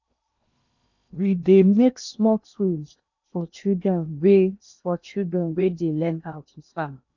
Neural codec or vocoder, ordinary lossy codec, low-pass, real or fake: codec, 16 kHz in and 24 kHz out, 0.8 kbps, FocalCodec, streaming, 65536 codes; none; 7.2 kHz; fake